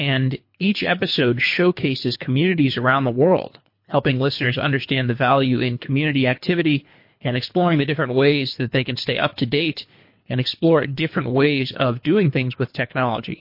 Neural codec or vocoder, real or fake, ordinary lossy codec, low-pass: codec, 24 kHz, 3 kbps, HILCodec; fake; MP3, 32 kbps; 5.4 kHz